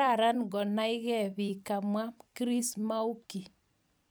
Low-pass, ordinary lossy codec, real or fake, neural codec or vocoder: none; none; fake; vocoder, 44.1 kHz, 128 mel bands every 256 samples, BigVGAN v2